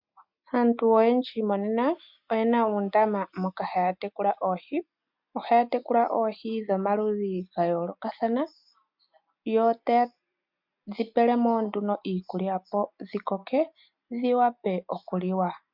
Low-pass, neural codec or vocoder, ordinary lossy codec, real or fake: 5.4 kHz; none; MP3, 48 kbps; real